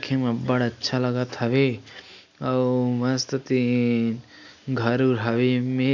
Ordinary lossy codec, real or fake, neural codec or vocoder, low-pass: none; real; none; 7.2 kHz